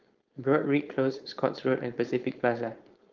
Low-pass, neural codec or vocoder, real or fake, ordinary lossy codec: 7.2 kHz; codec, 16 kHz, 4.8 kbps, FACodec; fake; Opus, 32 kbps